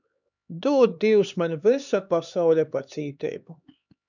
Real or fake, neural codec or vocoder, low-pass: fake; codec, 16 kHz, 2 kbps, X-Codec, HuBERT features, trained on LibriSpeech; 7.2 kHz